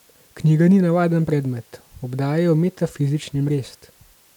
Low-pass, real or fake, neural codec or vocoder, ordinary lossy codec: 19.8 kHz; real; none; none